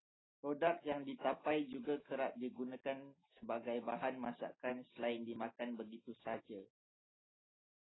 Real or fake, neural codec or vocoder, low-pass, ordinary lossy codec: real; none; 7.2 kHz; AAC, 16 kbps